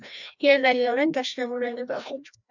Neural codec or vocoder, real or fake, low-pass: codec, 16 kHz, 1 kbps, FreqCodec, larger model; fake; 7.2 kHz